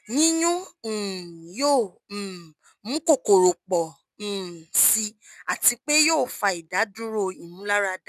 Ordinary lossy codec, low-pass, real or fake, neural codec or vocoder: none; 14.4 kHz; real; none